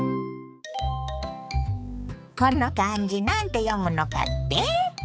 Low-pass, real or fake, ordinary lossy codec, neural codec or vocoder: none; fake; none; codec, 16 kHz, 4 kbps, X-Codec, HuBERT features, trained on balanced general audio